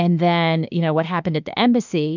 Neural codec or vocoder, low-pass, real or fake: autoencoder, 48 kHz, 32 numbers a frame, DAC-VAE, trained on Japanese speech; 7.2 kHz; fake